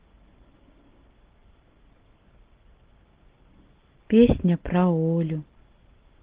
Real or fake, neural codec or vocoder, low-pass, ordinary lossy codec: real; none; 3.6 kHz; Opus, 16 kbps